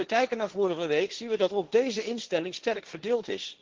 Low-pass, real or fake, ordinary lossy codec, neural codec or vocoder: 7.2 kHz; fake; Opus, 16 kbps; codec, 16 kHz, 1.1 kbps, Voila-Tokenizer